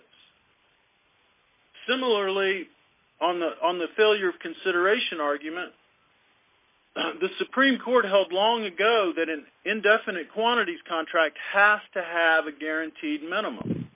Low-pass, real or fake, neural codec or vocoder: 3.6 kHz; real; none